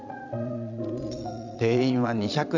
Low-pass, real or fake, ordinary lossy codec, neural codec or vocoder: 7.2 kHz; fake; none; vocoder, 22.05 kHz, 80 mel bands, Vocos